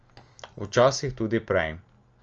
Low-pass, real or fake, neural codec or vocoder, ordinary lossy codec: 7.2 kHz; real; none; Opus, 24 kbps